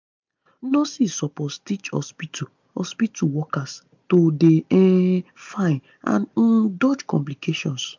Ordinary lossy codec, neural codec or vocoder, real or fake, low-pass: MP3, 64 kbps; none; real; 7.2 kHz